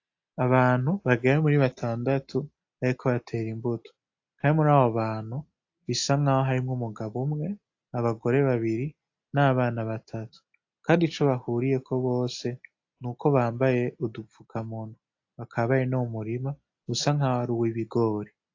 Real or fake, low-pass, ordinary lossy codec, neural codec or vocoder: real; 7.2 kHz; AAC, 48 kbps; none